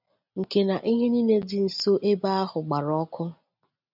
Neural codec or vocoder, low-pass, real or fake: none; 5.4 kHz; real